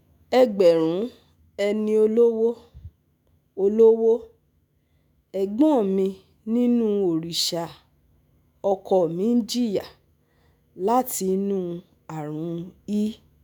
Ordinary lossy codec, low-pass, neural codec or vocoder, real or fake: none; none; autoencoder, 48 kHz, 128 numbers a frame, DAC-VAE, trained on Japanese speech; fake